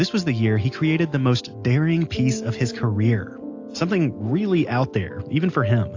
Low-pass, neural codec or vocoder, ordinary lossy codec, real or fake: 7.2 kHz; none; AAC, 48 kbps; real